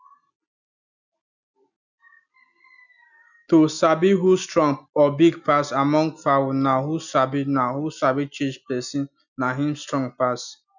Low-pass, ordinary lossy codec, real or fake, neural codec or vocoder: 7.2 kHz; none; real; none